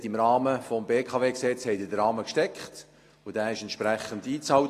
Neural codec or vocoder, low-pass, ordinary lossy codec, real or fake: none; 14.4 kHz; AAC, 48 kbps; real